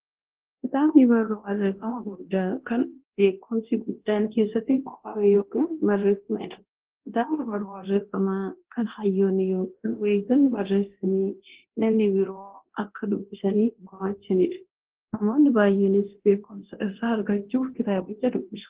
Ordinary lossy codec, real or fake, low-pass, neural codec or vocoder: Opus, 16 kbps; fake; 3.6 kHz; codec, 24 kHz, 0.9 kbps, DualCodec